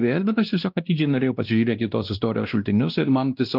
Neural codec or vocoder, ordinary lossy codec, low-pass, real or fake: codec, 16 kHz, 1 kbps, X-Codec, WavLM features, trained on Multilingual LibriSpeech; Opus, 24 kbps; 5.4 kHz; fake